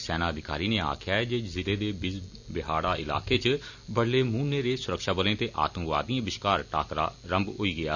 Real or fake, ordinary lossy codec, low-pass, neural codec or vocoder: real; none; 7.2 kHz; none